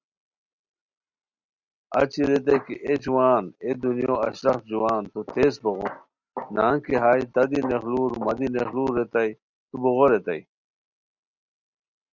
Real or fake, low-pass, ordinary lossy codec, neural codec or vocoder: real; 7.2 kHz; Opus, 64 kbps; none